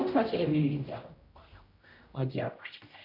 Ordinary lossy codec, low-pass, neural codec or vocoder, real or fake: AAC, 32 kbps; 5.4 kHz; codec, 16 kHz, 0.5 kbps, X-Codec, HuBERT features, trained on general audio; fake